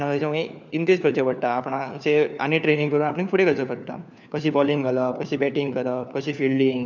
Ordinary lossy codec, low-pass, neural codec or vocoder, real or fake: none; 7.2 kHz; codec, 16 kHz, 4 kbps, FunCodec, trained on LibriTTS, 50 frames a second; fake